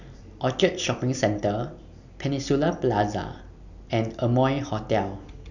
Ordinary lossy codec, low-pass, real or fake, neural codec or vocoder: none; 7.2 kHz; real; none